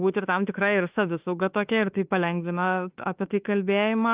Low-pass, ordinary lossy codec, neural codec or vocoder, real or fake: 3.6 kHz; Opus, 24 kbps; autoencoder, 48 kHz, 32 numbers a frame, DAC-VAE, trained on Japanese speech; fake